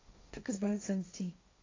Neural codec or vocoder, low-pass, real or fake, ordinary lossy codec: codec, 16 kHz, 1.1 kbps, Voila-Tokenizer; 7.2 kHz; fake; AAC, 32 kbps